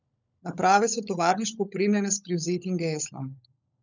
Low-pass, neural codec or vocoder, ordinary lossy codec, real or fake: 7.2 kHz; codec, 16 kHz, 16 kbps, FunCodec, trained on LibriTTS, 50 frames a second; none; fake